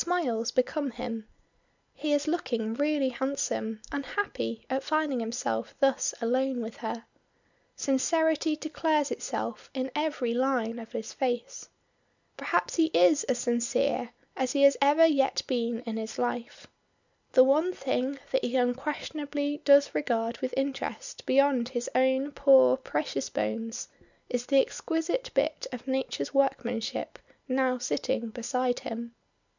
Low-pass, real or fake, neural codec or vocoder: 7.2 kHz; real; none